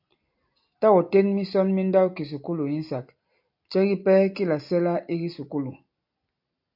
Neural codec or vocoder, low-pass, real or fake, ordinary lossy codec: none; 5.4 kHz; real; AAC, 48 kbps